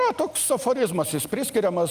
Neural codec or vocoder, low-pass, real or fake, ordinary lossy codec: none; 14.4 kHz; real; Opus, 32 kbps